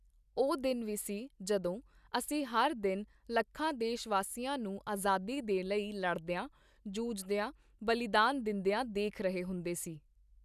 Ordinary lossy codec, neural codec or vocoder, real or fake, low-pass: none; none; real; 14.4 kHz